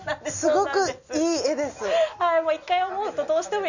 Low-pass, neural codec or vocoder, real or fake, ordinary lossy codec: 7.2 kHz; none; real; none